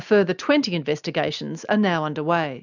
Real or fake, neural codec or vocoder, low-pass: real; none; 7.2 kHz